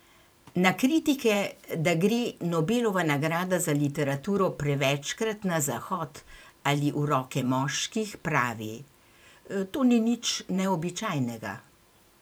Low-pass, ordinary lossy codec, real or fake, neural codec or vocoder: none; none; fake; vocoder, 44.1 kHz, 128 mel bands every 512 samples, BigVGAN v2